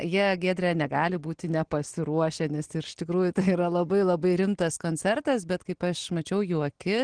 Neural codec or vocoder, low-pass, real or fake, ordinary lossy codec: none; 9.9 kHz; real; Opus, 16 kbps